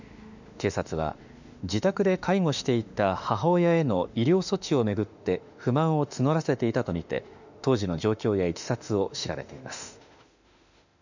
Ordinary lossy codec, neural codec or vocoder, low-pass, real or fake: none; autoencoder, 48 kHz, 32 numbers a frame, DAC-VAE, trained on Japanese speech; 7.2 kHz; fake